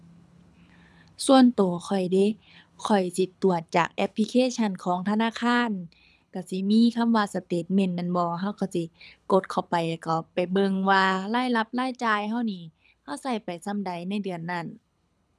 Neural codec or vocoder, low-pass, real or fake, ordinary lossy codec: codec, 24 kHz, 6 kbps, HILCodec; none; fake; none